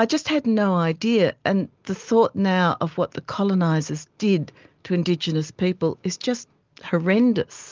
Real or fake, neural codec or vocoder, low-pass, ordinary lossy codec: real; none; 7.2 kHz; Opus, 32 kbps